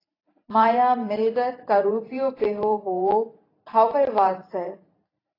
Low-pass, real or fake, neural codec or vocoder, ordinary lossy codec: 5.4 kHz; fake; vocoder, 44.1 kHz, 128 mel bands every 512 samples, BigVGAN v2; AAC, 24 kbps